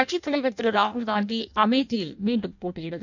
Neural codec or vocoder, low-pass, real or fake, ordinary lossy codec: codec, 16 kHz in and 24 kHz out, 0.6 kbps, FireRedTTS-2 codec; 7.2 kHz; fake; none